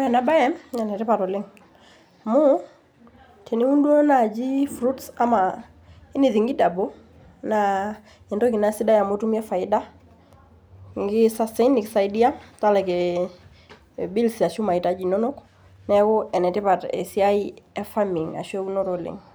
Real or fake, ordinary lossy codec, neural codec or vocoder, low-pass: real; none; none; none